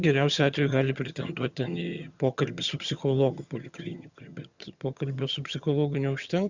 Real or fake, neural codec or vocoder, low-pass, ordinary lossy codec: fake; vocoder, 22.05 kHz, 80 mel bands, HiFi-GAN; 7.2 kHz; Opus, 64 kbps